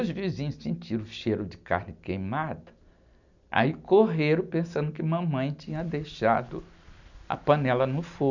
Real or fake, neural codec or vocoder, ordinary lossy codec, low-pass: real; none; none; 7.2 kHz